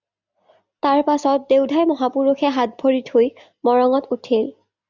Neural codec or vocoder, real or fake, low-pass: none; real; 7.2 kHz